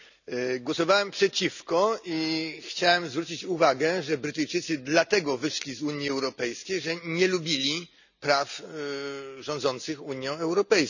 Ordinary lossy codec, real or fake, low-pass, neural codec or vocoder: none; real; 7.2 kHz; none